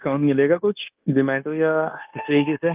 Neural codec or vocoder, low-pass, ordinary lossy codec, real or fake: codec, 16 kHz, 0.9 kbps, LongCat-Audio-Codec; 3.6 kHz; Opus, 24 kbps; fake